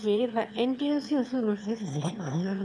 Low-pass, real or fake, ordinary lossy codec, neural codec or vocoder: none; fake; none; autoencoder, 22.05 kHz, a latent of 192 numbers a frame, VITS, trained on one speaker